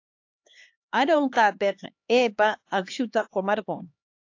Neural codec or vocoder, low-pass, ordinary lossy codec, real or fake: codec, 16 kHz, 2 kbps, X-Codec, HuBERT features, trained on LibriSpeech; 7.2 kHz; AAC, 48 kbps; fake